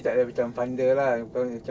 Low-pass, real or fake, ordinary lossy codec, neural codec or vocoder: none; real; none; none